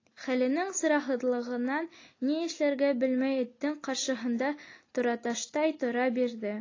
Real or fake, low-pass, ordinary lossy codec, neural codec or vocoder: real; 7.2 kHz; AAC, 32 kbps; none